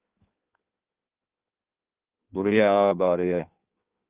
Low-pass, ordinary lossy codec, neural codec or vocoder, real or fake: 3.6 kHz; Opus, 32 kbps; codec, 16 kHz in and 24 kHz out, 1.1 kbps, FireRedTTS-2 codec; fake